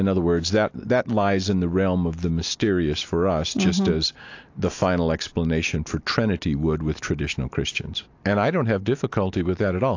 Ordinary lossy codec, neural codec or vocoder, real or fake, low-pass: AAC, 48 kbps; none; real; 7.2 kHz